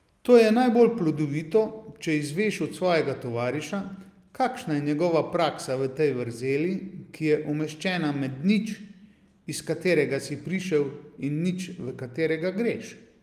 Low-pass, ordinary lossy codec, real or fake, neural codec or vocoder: 14.4 kHz; Opus, 32 kbps; real; none